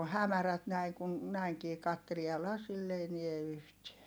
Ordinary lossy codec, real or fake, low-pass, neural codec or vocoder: none; real; none; none